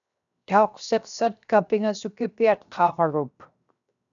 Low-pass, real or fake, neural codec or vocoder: 7.2 kHz; fake; codec, 16 kHz, 0.7 kbps, FocalCodec